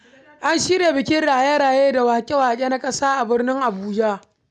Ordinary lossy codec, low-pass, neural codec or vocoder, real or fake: none; none; none; real